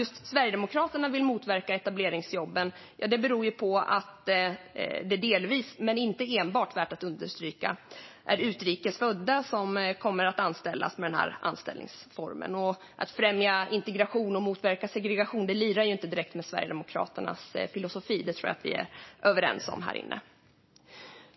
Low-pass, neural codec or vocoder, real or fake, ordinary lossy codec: 7.2 kHz; none; real; MP3, 24 kbps